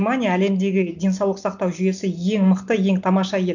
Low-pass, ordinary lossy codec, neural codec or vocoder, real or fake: 7.2 kHz; none; none; real